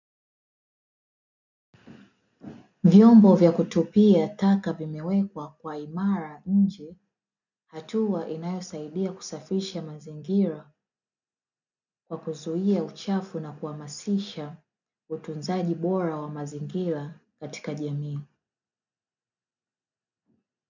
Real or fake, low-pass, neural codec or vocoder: real; 7.2 kHz; none